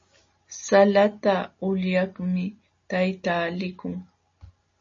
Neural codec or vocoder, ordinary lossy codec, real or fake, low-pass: none; MP3, 32 kbps; real; 7.2 kHz